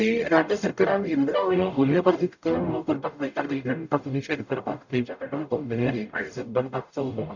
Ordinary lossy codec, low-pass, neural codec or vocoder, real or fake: none; 7.2 kHz; codec, 44.1 kHz, 0.9 kbps, DAC; fake